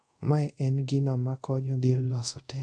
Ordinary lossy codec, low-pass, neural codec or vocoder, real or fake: none; none; codec, 24 kHz, 0.5 kbps, DualCodec; fake